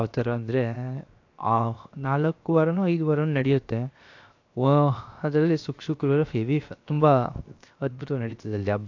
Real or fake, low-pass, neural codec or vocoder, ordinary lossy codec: fake; 7.2 kHz; codec, 16 kHz, 0.7 kbps, FocalCodec; AAC, 48 kbps